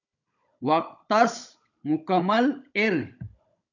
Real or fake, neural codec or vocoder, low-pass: fake; codec, 16 kHz, 16 kbps, FunCodec, trained on Chinese and English, 50 frames a second; 7.2 kHz